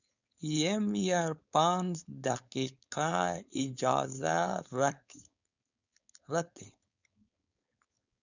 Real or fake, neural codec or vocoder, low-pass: fake; codec, 16 kHz, 4.8 kbps, FACodec; 7.2 kHz